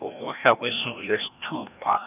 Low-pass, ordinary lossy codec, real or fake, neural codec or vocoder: 3.6 kHz; none; fake; codec, 16 kHz, 1 kbps, FreqCodec, larger model